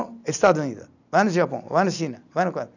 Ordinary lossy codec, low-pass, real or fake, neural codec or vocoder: none; 7.2 kHz; real; none